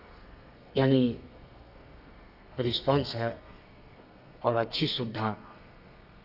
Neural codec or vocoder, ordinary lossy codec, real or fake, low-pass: codec, 32 kHz, 1.9 kbps, SNAC; none; fake; 5.4 kHz